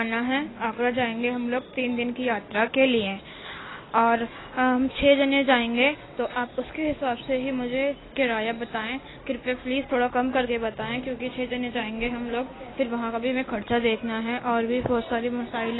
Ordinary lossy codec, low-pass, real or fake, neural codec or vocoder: AAC, 16 kbps; 7.2 kHz; real; none